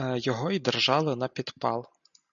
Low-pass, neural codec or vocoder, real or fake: 7.2 kHz; none; real